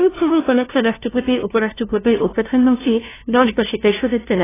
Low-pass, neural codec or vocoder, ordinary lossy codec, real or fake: 3.6 kHz; codec, 16 kHz, 0.5 kbps, FunCodec, trained on LibriTTS, 25 frames a second; AAC, 16 kbps; fake